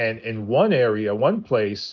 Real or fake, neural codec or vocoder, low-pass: real; none; 7.2 kHz